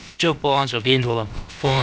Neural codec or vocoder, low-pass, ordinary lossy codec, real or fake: codec, 16 kHz, about 1 kbps, DyCAST, with the encoder's durations; none; none; fake